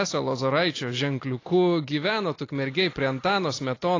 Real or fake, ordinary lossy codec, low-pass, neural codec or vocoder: real; AAC, 32 kbps; 7.2 kHz; none